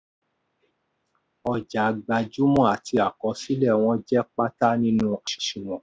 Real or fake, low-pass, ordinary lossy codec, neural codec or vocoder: real; none; none; none